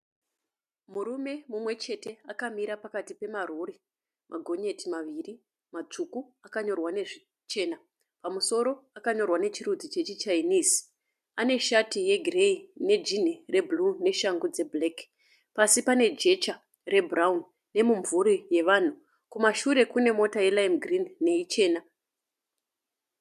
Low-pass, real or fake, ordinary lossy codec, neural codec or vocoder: 14.4 kHz; real; MP3, 96 kbps; none